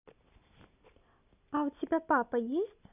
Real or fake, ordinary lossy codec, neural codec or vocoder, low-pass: real; none; none; 3.6 kHz